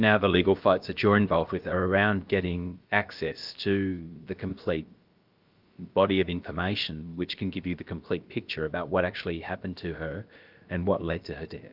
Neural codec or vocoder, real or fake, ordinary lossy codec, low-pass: codec, 16 kHz, about 1 kbps, DyCAST, with the encoder's durations; fake; Opus, 24 kbps; 5.4 kHz